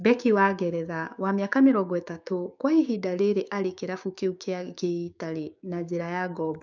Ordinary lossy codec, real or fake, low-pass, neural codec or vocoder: none; fake; 7.2 kHz; codec, 16 kHz, 6 kbps, DAC